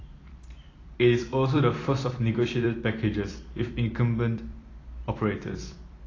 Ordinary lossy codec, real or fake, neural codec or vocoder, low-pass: AAC, 32 kbps; fake; vocoder, 44.1 kHz, 128 mel bands every 256 samples, BigVGAN v2; 7.2 kHz